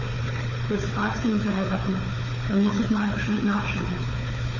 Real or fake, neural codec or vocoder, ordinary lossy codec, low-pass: fake; codec, 16 kHz, 4 kbps, FunCodec, trained on Chinese and English, 50 frames a second; MP3, 32 kbps; 7.2 kHz